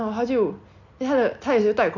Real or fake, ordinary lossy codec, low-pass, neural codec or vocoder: real; none; 7.2 kHz; none